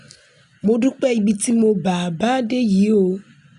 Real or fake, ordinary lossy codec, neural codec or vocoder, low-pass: real; none; none; 10.8 kHz